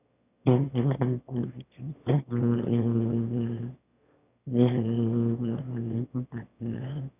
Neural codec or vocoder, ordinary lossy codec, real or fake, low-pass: autoencoder, 22.05 kHz, a latent of 192 numbers a frame, VITS, trained on one speaker; none; fake; 3.6 kHz